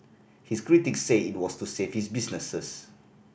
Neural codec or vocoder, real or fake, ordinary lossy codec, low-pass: none; real; none; none